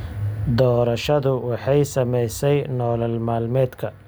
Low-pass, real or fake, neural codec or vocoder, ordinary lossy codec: none; real; none; none